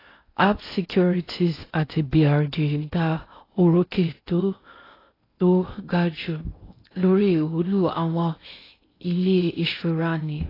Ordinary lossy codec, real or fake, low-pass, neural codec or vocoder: AAC, 24 kbps; fake; 5.4 kHz; codec, 16 kHz in and 24 kHz out, 0.6 kbps, FocalCodec, streaming, 2048 codes